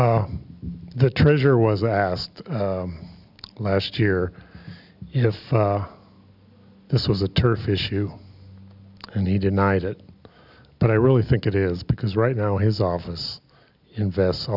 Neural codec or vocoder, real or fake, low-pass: none; real; 5.4 kHz